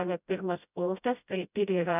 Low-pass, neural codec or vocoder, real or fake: 3.6 kHz; codec, 16 kHz, 0.5 kbps, FreqCodec, smaller model; fake